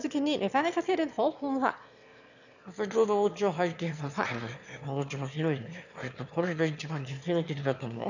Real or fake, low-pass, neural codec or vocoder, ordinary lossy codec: fake; 7.2 kHz; autoencoder, 22.05 kHz, a latent of 192 numbers a frame, VITS, trained on one speaker; none